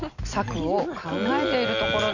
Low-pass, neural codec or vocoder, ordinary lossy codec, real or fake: 7.2 kHz; none; none; real